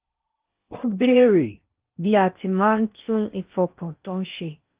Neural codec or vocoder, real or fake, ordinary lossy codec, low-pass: codec, 16 kHz in and 24 kHz out, 0.6 kbps, FocalCodec, streaming, 4096 codes; fake; Opus, 32 kbps; 3.6 kHz